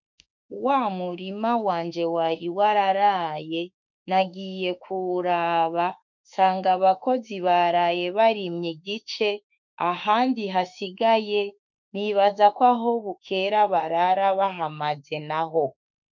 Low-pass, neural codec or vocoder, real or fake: 7.2 kHz; autoencoder, 48 kHz, 32 numbers a frame, DAC-VAE, trained on Japanese speech; fake